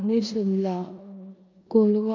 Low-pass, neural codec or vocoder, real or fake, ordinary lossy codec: 7.2 kHz; codec, 16 kHz in and 24 kHz out, 0.9 kbps, LongCat-Audio-Codec, four codebook decoder; fake; MP3, 64 kbps